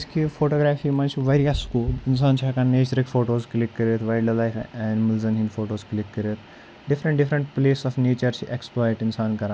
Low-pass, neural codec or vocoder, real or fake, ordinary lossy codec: none; none; real; none